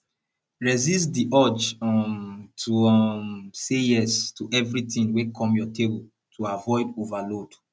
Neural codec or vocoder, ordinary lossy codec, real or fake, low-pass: none; none; real; none